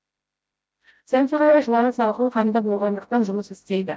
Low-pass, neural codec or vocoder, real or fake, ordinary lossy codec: none; codec, 16 kHz, 0.5 kbps, FreqCodec, smaller model; fake; none